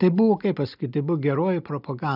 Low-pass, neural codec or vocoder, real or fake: 5.4 kHz; none; real